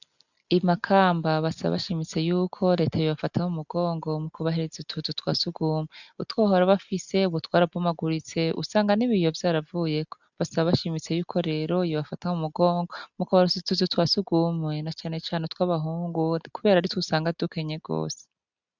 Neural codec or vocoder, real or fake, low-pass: none; real; 7.2 kHz